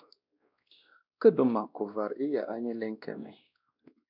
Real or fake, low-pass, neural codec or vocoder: fake; 5.4 kHz; codec, 16 kHz, 1 kbps, X-Codec, WavLM features, trained on Multilingual LibriSpeech